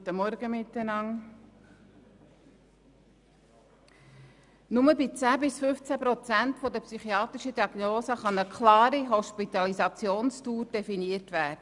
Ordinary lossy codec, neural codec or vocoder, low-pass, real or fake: none; none; 10.8 kHz; real